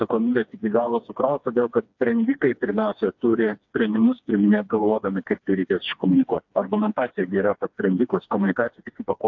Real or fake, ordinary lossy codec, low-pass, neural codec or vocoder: fake; AAC, 48 kbps; 7.2 kHz; codec, 16 kHz, 2 kbps, FreqCodec, smaller model